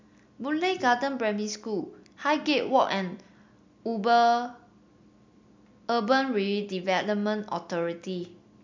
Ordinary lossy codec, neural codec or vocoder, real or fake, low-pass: MP3, 64 kbps; none; real; 7.2 kHz